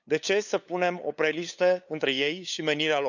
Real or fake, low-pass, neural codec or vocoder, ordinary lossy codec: fake; 7.2 kHz; codec, 16 kHz, 8 kbps, FunCodec, trained on LibriTTS, 25 frames a second; none